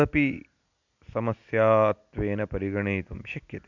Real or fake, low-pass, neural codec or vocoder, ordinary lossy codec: real; 7.2 kHz; none; none